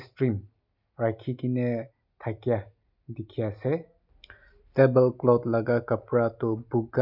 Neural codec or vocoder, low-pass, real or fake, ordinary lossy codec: none; 5.4 kHz; real; none